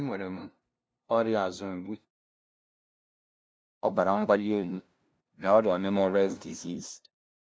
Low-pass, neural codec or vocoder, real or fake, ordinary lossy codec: none; codec, 16 kHz, 0.5 kbps, FunCodec, trained on LibriTTS, 25 frames a second; fake; none